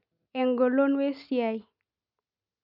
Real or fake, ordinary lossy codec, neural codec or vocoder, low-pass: real; none; none; 5.4 kHz